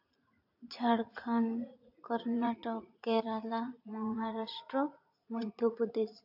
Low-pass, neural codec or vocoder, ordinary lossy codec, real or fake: 5.4 kHz; vocoder, 44.1 kHz, 80 mel bands, Vocos; AAC, 48 kbps; fake